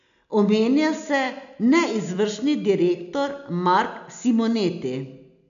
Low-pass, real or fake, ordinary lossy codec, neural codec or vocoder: 7.2 kHz; real; none; none